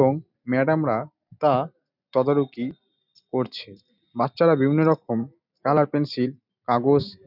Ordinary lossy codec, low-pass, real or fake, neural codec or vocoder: none; 5.4 kHz; real; none